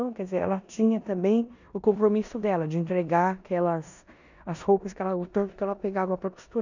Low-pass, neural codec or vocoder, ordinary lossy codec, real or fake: 7.2 kHz; codec, 16 kHz in and 24 kHz out, 0.9 kbps, LongCat-Audio-Codec, four codebook decoder; none; fake